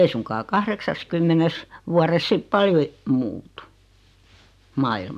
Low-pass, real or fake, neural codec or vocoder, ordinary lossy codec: 14.4 kHz; real; none; none